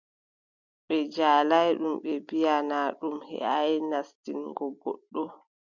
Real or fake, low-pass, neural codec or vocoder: real; 7.2 kHz; none